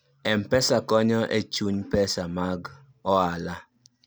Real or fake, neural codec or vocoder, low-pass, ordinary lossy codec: real; none; none; none